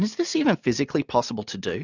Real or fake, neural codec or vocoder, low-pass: real; none; 7.2 kHz